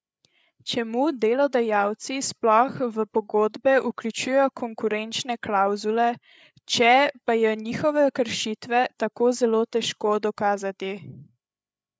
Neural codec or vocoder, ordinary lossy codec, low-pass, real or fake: codec, 16 kHz, 8 kbps, FreqCodec, larger model; none; none; fake